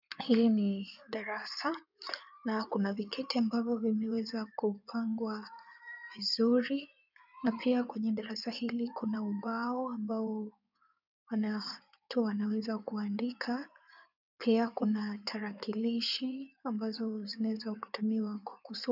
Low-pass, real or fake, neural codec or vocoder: 5.4 kHz; fake; codec, 16 kHz in and 24 kHz out, 2.2 kbps, FireRedTTS-2 codec